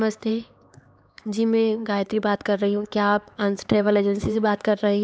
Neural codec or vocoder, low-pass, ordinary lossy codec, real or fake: codec, 16 kHz, 4 kbps, X-Codec, HuBERT features, trained on LibriSpeech; none; none; fake